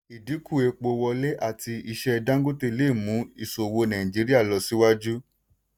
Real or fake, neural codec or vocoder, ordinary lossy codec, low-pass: real; none; none; none